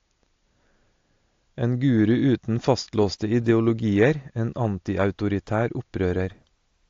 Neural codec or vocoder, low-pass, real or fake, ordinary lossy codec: none; 7.2 kHz; real; AAC, 48 kbps